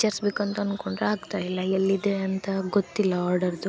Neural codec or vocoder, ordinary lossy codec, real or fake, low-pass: none; none; real; none